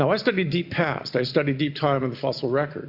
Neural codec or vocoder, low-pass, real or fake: none; 5.4 kHz; real